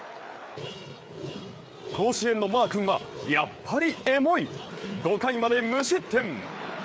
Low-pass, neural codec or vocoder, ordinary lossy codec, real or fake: none; codec, 16 kHz, 4 kbps, FreqCodec, larger model; none; fake